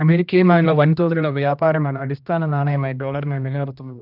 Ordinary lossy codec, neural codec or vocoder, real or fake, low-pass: none; codec, 16 kHz, 1 kbps, X-Codec, HuBERT features, trained on general audio; fake; 5.4 kHz